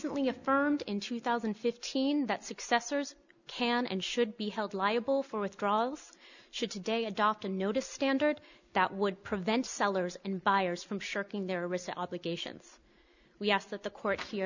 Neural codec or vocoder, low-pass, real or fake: none; 7.2 kHz; real